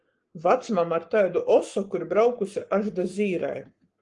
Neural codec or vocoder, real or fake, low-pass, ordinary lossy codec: codec, 44.1 kHz, 7.8 kbps, Pupu-Codec; fake; 10.8 kHz; Opus, 24 kbps